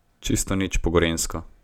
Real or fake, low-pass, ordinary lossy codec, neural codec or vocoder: real; 19.8 kHz; none; none